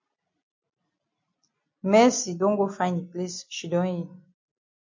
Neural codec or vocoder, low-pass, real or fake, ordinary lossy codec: none; 7.2 kHz; real; MP3, 48 kbps